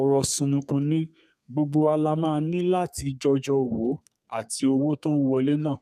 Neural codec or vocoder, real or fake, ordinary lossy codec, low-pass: codec, 32 kHz, 1.9 kbps, SNAC; fake; none; 14.4 kHz